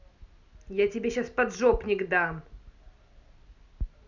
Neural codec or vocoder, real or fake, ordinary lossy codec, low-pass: none; real; none; 7.2 kHz